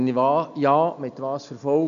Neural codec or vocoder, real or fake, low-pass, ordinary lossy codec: none; real; 7.2 kHz; none